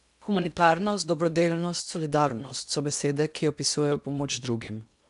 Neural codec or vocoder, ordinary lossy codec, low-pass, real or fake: codec, 16 kHz in and 24 kHz out, 0.8 kbps, FocalCodec, streaming, 65536 codes; none; 10.8 kHz; fake